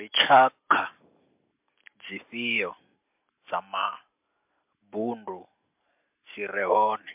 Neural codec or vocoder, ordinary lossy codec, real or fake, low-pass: none; MP3, 32 kbps; real; 3.6 kHz